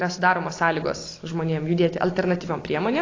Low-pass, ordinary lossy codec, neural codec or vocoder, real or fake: 7.2 kHz; AAC, 32 kbps; none; real